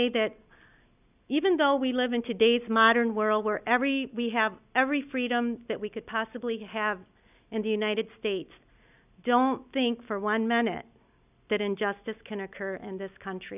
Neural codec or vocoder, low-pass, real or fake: none; 3.6 kHz; real